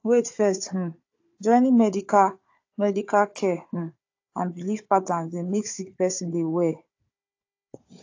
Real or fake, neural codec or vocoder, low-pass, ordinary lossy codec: fake; codec, 16 kHz, 4 kbps, FunCodec, trained on Chinese and English, 50 frames a second; 7.2 kHz; AAC, 48 kbps